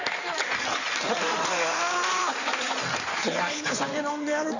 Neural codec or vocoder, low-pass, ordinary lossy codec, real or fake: codec, 16 kHz in and 24 kHz out, 2.2 kbps, FireRedTTS-2 codec; 7.2 kHz; none; fake